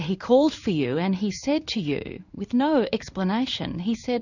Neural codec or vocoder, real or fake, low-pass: codec, 16 kHz in and 24 kHz out, 1 kbps, XY-Tokenizer; fake; 7.2 kHz